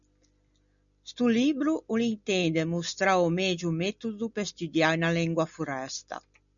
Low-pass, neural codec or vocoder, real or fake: 7.2 kHz; none; real